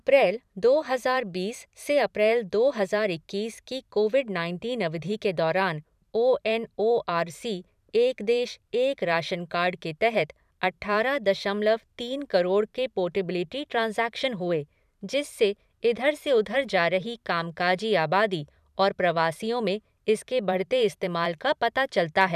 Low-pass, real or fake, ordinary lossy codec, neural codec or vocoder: 14.4 kHz; fake; none; vocoder, 44.1 kHz, 128 mel bands every 512 samples, BigVGAN v2